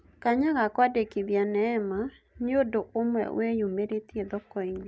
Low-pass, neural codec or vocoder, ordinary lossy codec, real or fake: none; none; none; real